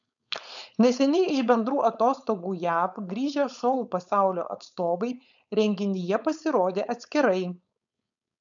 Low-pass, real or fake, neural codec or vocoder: 7.2 kHz; fake; codec, 16 kHz, 4.8 kbps, FACodec